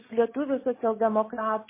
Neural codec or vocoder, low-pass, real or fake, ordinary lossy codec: none; 3.6 kHz; real; MP3, 16 kbps